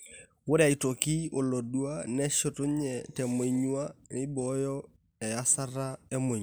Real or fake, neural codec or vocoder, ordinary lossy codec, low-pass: real; none; none; none